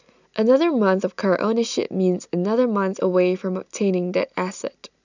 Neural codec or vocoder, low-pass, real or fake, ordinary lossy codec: none; 7.2 kHz; real; none